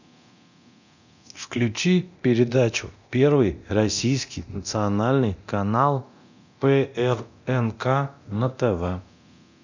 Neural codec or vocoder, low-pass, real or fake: codec, 24 kHz, 0.9 kbps, DualCodec; 7.2 kHz; fake